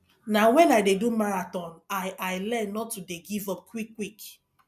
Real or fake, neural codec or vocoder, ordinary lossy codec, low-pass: real; none; none; 14.4 kHz